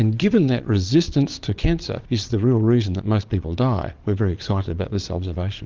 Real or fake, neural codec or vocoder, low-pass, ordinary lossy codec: fake; vocoder, 22.05 kHz, 80 mel bands, Vocos; 7.2 kHz; Opus, 32 kbps